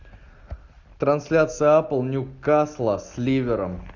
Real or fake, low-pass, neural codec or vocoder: real; 7.2 kHz; none